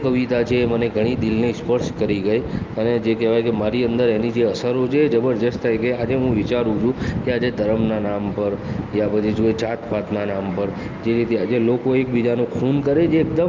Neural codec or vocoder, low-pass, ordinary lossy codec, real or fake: none; 7.2 kHz; Opus, 24 kbps; real